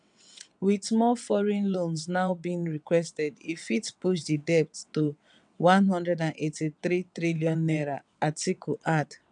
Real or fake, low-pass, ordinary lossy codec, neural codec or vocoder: fake; 9.9 kHz; none; vocoder, 22.05 kHz, 80 mel bands, WaveNeXt